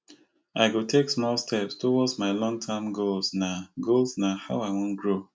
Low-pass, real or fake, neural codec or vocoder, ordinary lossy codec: none; real; none; none